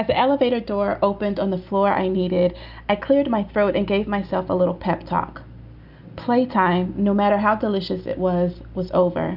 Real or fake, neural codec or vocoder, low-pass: real; none; 5.4 kHz